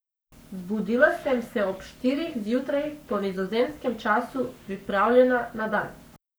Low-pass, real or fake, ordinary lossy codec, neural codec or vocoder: none; fake; none; codec, 44.1 kHz, 7.8 kbps, Pupu-Codec